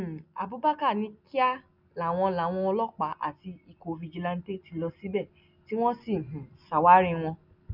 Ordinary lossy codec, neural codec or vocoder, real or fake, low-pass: none; none; real; 5.4 kHz